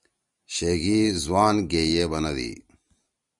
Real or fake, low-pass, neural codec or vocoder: real; 10.8 kHz; none